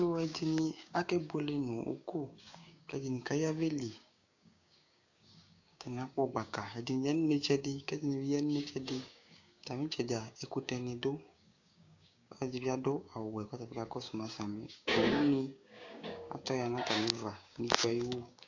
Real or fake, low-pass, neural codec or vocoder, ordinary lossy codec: fake; 7.2 kHz; codec, 16 kHz, 6 kbps, DAC; AAC, 48 kbps